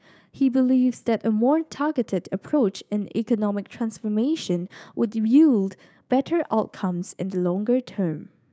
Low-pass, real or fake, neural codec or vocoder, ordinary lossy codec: none; fake; codec, 16 kHz, 6 kbps, DAC; none